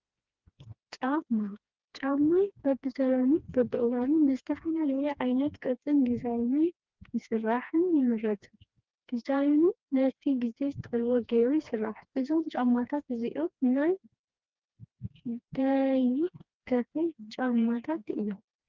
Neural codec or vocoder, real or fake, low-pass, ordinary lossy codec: codec, 16 kHz, 2 kbps, FreqCodec, smaller model; fake; 7.2 kHz; Opus, 24 kbps